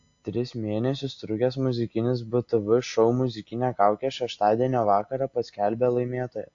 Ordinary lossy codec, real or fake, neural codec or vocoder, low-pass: AAC, 48 kbps; real; none; 7.2 kHz